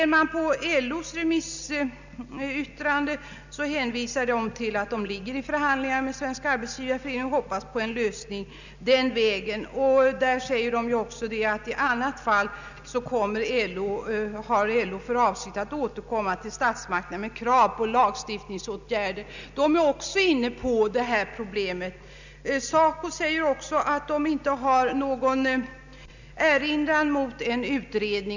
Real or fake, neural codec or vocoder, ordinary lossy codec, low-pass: real; none; none; 7.2 kHz